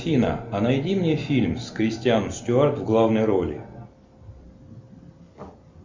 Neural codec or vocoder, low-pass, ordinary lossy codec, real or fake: none; 7.2 kHz; MP3, 64 kbps; real